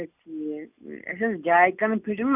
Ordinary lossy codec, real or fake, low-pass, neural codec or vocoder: none; real; 3.6 kHz; none